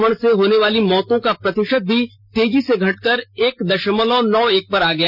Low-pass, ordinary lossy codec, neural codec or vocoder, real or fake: 5.4 kHz; none; none; real